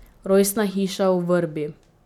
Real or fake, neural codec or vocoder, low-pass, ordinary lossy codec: real; none; 19.8 kHz; none